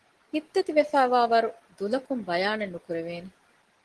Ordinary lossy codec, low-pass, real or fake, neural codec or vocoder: Opus, 16 kbps; 10.8 kHz; real; none